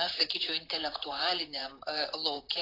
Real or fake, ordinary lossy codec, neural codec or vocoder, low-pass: fake; AAC, 24 kbps; vocoder, 44.1 kHz, 128 mel bands, Pupu-Vocoder; 5.4 kHz